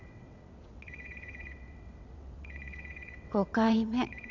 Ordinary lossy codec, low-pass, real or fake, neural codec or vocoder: none; 7.2 kHz; fake; vocoder, 22.05 kHz, 80 mel bands, WaveNeXt